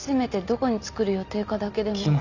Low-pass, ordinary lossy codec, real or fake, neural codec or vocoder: 7.2 kHz; none; real; none